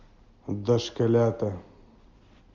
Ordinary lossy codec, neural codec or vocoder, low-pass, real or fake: MP3, 64 kbps; none; 7.2 kHz; real